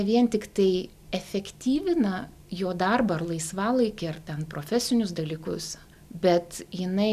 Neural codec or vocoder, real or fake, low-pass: none; real; 14.4 kHz